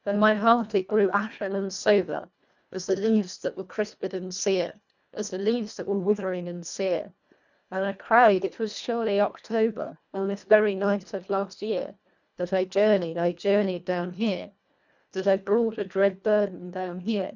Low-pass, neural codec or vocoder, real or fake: 7.2 kHz; codec, 24 kHz, 1.5 kbps, HILCodec; fake